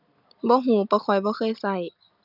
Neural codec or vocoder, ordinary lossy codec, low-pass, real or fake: none; none; 5.4 kHz; real